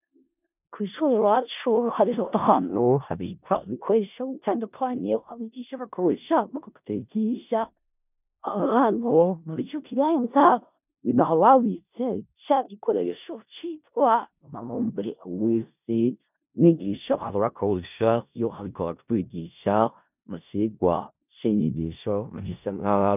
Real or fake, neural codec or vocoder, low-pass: fake; codec, 16 kHz in and 24 kHz out, 0.4 kbps, LongCat-Audio-Codec, four codebook decoder; 3.6 kHz